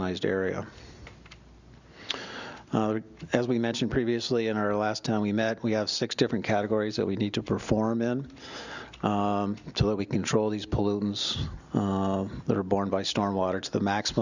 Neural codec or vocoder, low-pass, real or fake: none; 7.2 kHz; real